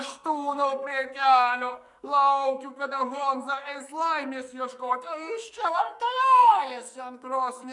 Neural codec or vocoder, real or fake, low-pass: codec, 44.1 kHz, 2.6 kbps, SNAC; fake; 10.8 kHz